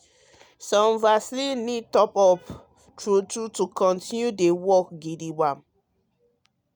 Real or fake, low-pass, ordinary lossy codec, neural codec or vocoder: real; none; none; none